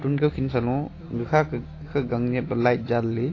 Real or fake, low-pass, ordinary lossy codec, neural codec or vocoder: real; 7.2 kHz; AAC, 32 kbps; none